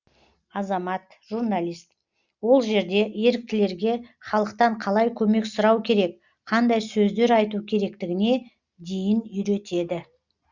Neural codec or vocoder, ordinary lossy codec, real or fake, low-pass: none; Opus, 64 kbps; real; 7.2 kHz